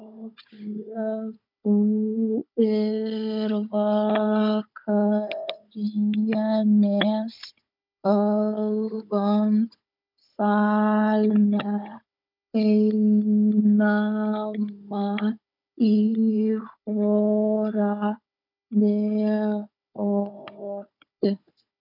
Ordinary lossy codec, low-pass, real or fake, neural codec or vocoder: MP3, 48 kbps; 5.4 kHz; fake; codec, 16 kHz, 16 kbps, FunCodec, trained on Chinese and English, 50 frames a second